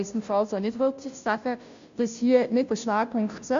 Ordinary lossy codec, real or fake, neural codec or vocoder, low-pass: MP3, 64 kbps; fake; codec, 16 kHz, 0.5 kbps, FunCodec, trained on Chinese and English, 25 frames a second; 7.2 kHz